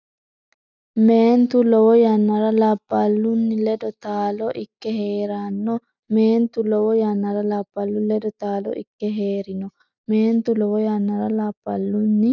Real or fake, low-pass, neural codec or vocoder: real; 7.2 kHz; none